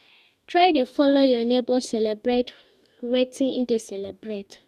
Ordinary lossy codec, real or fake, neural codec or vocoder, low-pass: none; fake; codec, 44.1 kHz, 2.6 kbps, DAC; 14.4 kHz